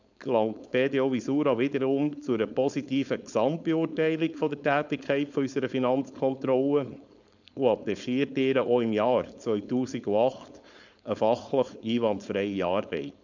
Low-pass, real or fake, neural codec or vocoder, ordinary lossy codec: 7.2 kHz; fake; codec, 16 kHz, 4.8 kbps, FACodec; none